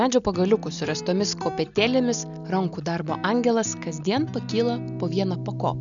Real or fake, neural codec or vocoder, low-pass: real; none; 7.2 kHz